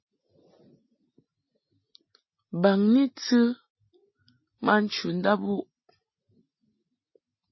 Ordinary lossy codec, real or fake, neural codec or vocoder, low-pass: MP3, 24 kbps; real; none; 7.2 kHz